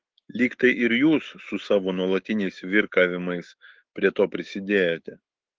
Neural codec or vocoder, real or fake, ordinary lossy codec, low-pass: autoencoder, 48 kHz, 128 numbers a frame, DAC-VAE, trained on Japanese speech; fake; Opus, 16 kbps; 7.2 kHz